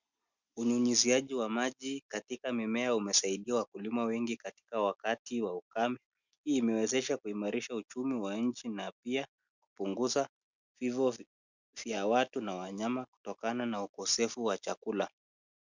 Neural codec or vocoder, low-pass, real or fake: none; 7.2 kHz; real